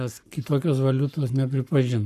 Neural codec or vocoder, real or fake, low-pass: codec, 44.1 kHz, 7.8 kbps, Pupu-Codec; fake; 14.4 kHz